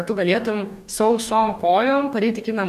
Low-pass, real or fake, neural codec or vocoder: 14.4 kHz; fake; codec, 44.1 kHz, 2.6 kbps, DAC